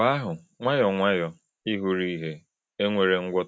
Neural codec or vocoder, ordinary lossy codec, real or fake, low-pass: none; none; real; none